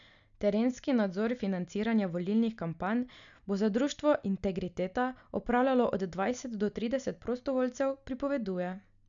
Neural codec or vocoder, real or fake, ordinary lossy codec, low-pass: none; real; none; 7.2 kHz